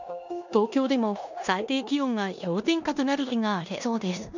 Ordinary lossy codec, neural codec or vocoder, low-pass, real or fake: none; codec, 16 kHz in and 24 kHz out, 0.9 kbps, LongCat-Audio-Codec, four codebook decoder; 7.2 kHz; fake